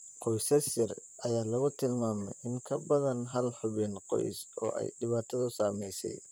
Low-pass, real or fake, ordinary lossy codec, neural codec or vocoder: none; fake; none; vocoder, 44.1 kHz, 128 mel bands, Pupu-Vocoder